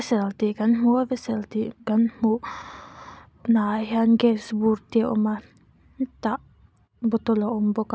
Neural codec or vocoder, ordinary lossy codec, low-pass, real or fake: none; none; none; real